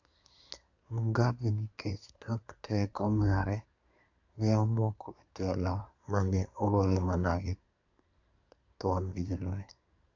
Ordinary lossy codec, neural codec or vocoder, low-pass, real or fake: AAC, 48 kbps; codec, 24 kHz, 1 kbps, SNAC; 7.2 kHz; fake